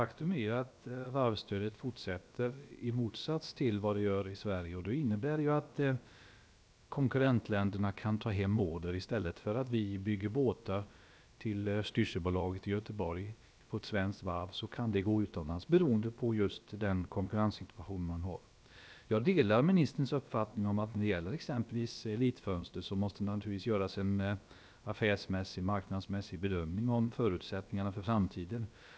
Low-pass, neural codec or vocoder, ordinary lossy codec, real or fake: none; codec, 16 kHz, about 1 kbps, DyCAST, with the encoder's durations; none; fake